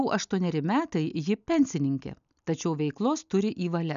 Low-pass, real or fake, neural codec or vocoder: 7.2 kHz; real; none